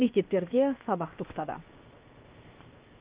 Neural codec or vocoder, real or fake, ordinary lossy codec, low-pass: codec, 16 kHz, 0.7 kbps, FocalCodec; fake; Opus, 24 kbps; 3.6 kHz